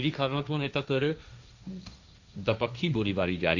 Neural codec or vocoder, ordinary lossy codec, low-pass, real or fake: codec, 16 kHz, 1.1 kbps, Voila-Tokenizer; none; 7.2 kHz; fake